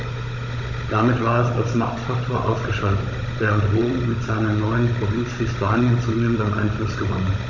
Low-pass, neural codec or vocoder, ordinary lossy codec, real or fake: 7.2 kHz; codec, 16 kHz, 16 kbps, FunCodec, trained on Chinese and English, 50 frames a second; none; fake